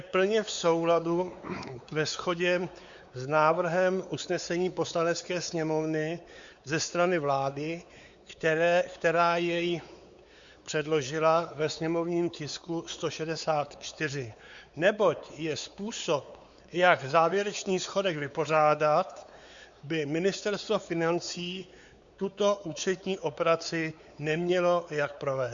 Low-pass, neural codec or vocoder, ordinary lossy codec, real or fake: 7.2 kHz; codec, 16 kHz, 4 kbps, X-Codec, WavLM features, trained on Multilingual LibriSpeech; Opus, 64 kbps; fake